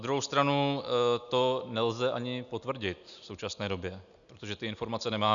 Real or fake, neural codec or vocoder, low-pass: real; none; 7.2 kHz